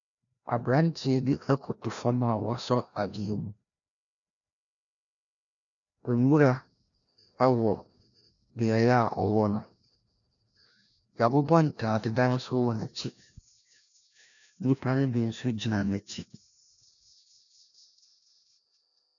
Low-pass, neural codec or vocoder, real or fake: 7.2 kHz; codec, 16 kHz, 1 kbps, FreqCodec, larger model; fake